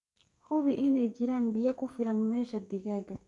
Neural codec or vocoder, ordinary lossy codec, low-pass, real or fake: codec, 44.1 kHz, 2.6 kbps, SNAC; none; 10.8 kHz; fake